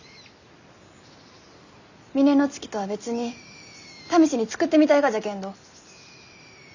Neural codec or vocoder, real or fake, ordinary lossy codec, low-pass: none; real; none; 7.2 kHz